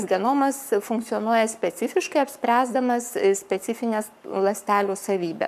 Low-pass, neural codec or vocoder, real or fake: 14.4 kHz; codec, 44.1 kHz, 7.8 kbps, Pupu-Codec; fake